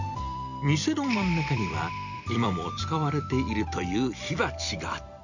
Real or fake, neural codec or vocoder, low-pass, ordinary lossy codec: fake; vocoder, 44.1 kHz, 128 mel bands every 256 samples, BigVGAN v2; 7.2 kHz; none